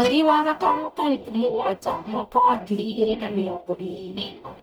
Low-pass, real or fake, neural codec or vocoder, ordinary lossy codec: none; fake; codec, 44.1 kHz, 0.9 kbps, DAC; none